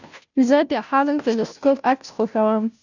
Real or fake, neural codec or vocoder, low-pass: fake; codec, 16 kHz, 0.5 kbps, FunCodec, trained on Chinese and English, 25 frames a second; 7.2 kHz